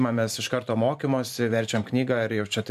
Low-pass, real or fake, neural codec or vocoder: 14.4 kHz; fake; vocoder, 44.1 kHz, 128 mel bands every 512 samples, BigVGAN v2